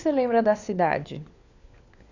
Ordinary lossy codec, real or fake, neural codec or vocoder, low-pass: none; fake; codec, 16 kHz, 4 kbps, FunCodec, trained on LibriTTS, 50 frames a second; 7.2 kHz